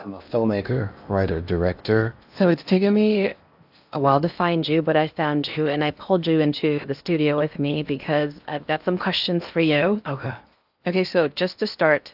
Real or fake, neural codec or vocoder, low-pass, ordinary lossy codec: fake; codec, 16 kHz in and 24 kHz out, 0.8 kbps, FocalCodec, streaming, 65536 codes; 5.4 kHz; AAC, 48 kbps